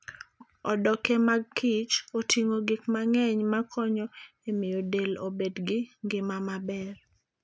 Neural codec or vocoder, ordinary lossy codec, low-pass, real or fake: none; none; none; real